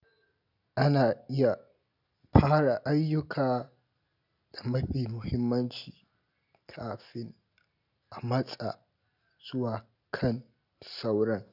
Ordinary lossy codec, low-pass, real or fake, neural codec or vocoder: none; 5.4 kHz; real; none